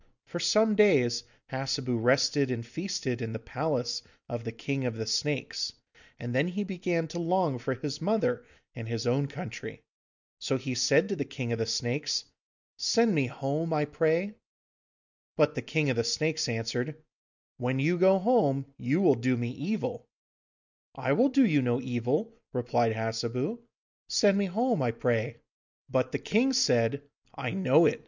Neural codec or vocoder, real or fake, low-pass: none; real; 7.2 kHz